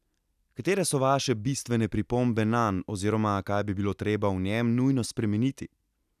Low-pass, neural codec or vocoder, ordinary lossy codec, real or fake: 14.4 kHz; none; none; real